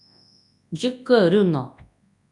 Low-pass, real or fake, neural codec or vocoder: 10.8 kHz; fake; codec, 24 kHz, 0.9 kbps, WavTokenizer, large speech release